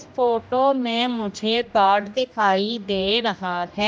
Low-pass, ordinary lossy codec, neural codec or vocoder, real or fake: none; none; codec, 16 kHz, 1 kbps, X-Codec, HuBERT features, trained on general audio; fake